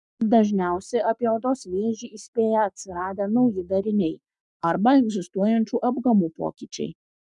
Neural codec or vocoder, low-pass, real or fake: codec, 44.1 kHz, 7.8 kbps, Pupu-Codec; 10.8 kHz; fake